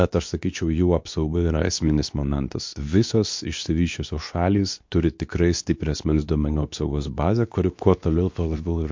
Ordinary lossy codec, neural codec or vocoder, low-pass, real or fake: MP3, 48 kbps; codec, 24 kHz, 0.9 kbps, WavTokenizer, medium speech release version 2; 7.2 kHz; fake